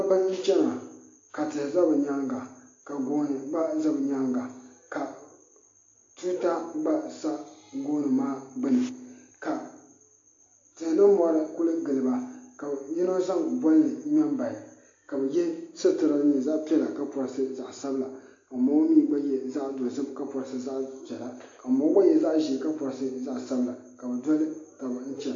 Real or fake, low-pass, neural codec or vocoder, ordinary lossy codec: real; 7.2 kHz; none; AAC, 48 kbps